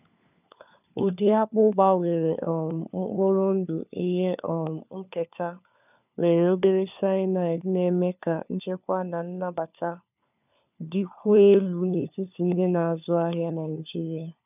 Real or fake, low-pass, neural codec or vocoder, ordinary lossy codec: fake; 3.6 kHz; codec, 16 kHz, 4 kbps, FunCodec, trained on LibriTTS, 50 frames a second; none